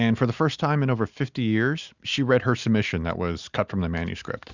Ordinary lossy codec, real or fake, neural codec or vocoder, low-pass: Opus, 64 kbps; real; none; 7.2 kHz